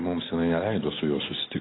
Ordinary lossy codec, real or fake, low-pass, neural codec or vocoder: AAC, 16 kbps; real; 7.2 kHz; none